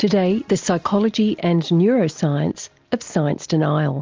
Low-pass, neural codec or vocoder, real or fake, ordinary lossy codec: 7.2 kHz; none; real; Opus, 32 kbps